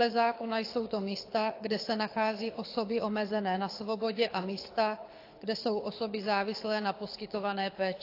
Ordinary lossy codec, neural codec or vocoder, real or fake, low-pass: AAC, 32 kbps; codec, 24 kHz, 6 kbps, HILCodec; fake; 5.4 kHz